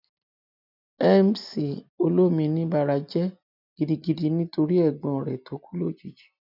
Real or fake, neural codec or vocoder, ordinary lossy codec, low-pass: real; none; none; 5.4 kHz